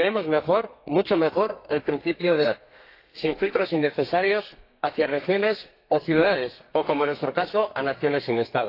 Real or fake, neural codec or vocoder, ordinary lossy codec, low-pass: fake; codec, 32 kHz, 1.9 kbps, SNAC; AAC, 32 kbps; 5.4 kHz